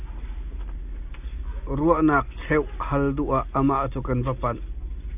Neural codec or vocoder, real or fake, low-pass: none; real; 3.6 kHz